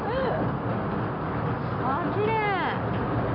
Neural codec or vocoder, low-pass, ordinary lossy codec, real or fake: none; 5.4 kHz; none; real